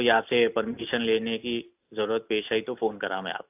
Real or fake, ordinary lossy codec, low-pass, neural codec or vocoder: real; AAC, 32 kbps; 3.6 kHz; none